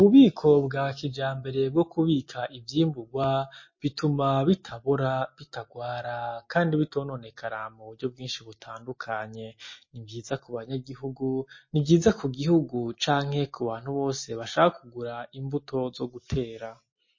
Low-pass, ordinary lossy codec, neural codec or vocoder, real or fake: 7.2 kHz; MP3, 32 kbps; none; real